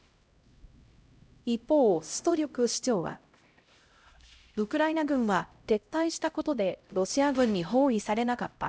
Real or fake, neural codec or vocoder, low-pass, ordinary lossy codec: fake; codec, 16 kHz, 0.5 kbps, X-Codec, HuBERT features, trained on LibriSpeech; none; none